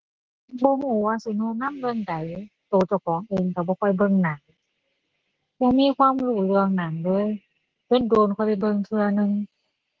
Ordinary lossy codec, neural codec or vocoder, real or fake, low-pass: Opus, 16 kbps; none; real; 7.2 kHz